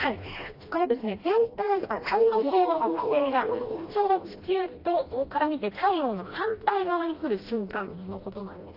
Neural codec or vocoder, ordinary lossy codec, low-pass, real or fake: codec, 16 kHz, 1 kbps, FreqCodec, smaller model; AAC, 32 kbps; 5.4 kHz; fake